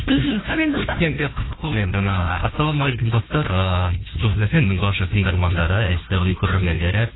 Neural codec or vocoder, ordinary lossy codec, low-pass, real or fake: codec, 16 kHz, 1 kbps, FunCodec, trained on Chinese and English, 50 frames a second; AAC, 16 kbps; 7.2 kHz; fake